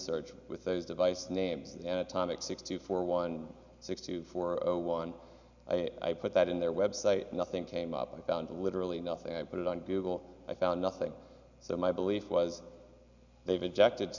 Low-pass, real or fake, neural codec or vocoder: 7.2 kHz; real; none